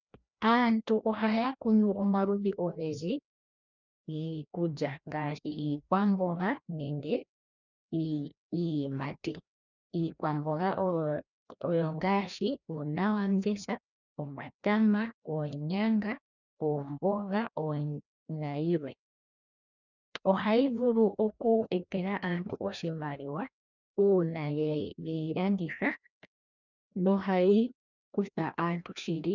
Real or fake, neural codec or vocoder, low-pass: fake; codec, 16 kHz, 1 kbps, FreqCodec, larger model; 7.2 kHz